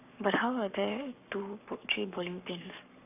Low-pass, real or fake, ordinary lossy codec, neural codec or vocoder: 3.6 kHz; fake; none; codec, 44.1 kHz, 7.8 kbps, Pupu-Codec